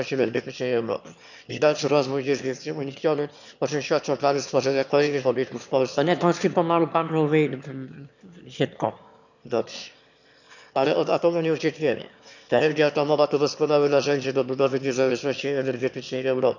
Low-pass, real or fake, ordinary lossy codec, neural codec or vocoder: 7.2 kHz; fake; none; autoencoder, 22.05 kHz, a latent of 192 numbers a frame, VITS, trained on one speaker